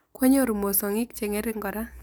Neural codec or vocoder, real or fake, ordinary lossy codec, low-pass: none; real; none; none